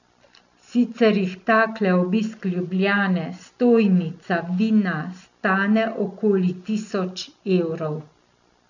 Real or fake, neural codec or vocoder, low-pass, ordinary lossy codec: real; none; 7.2 kHz; none